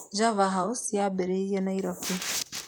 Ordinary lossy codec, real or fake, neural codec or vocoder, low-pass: none; fake; vocoder, 44.1 kHz, 128 mel bands, Pupu-Vocoder; none